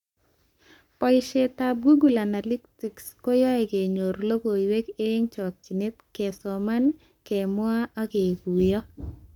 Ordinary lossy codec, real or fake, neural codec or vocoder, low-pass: none; fake; codec, 44.1 kHz, 7.8 kbps, Pupu-Codec; 19.8 kHz